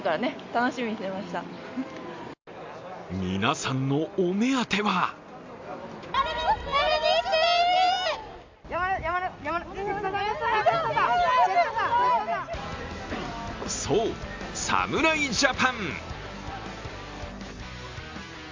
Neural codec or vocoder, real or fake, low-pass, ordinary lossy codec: none; real; 7.2 kHz; MP3, 64 kbps